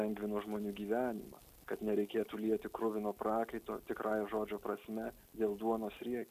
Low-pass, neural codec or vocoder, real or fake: 14.4 kHz; none; real